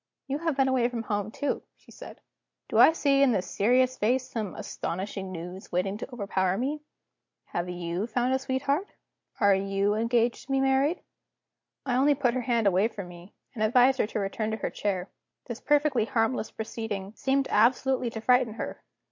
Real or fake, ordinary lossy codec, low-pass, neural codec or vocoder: real; MP3, 48 kbps; 7.2 kHz; none